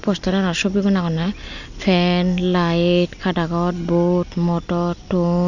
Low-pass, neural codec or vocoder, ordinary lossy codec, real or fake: 7.2 kHz; none; none; real